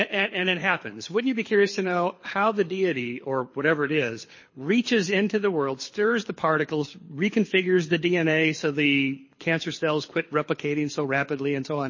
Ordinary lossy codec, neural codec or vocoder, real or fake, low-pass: MP3, 32 kbps; codec, 24 kHz, 6 kbps, HILCodec; fake; 7.2 kHz